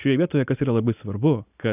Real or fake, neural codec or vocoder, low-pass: real; none; 3.6 kHz